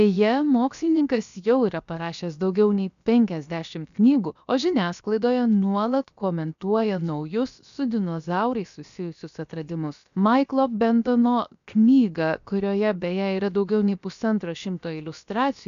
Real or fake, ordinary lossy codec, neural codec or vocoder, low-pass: fake; MP3, 96 kbps; codec, 16 kHz, about 1 kbps, DyCAST, with the encoder's durations; 7.2 kHz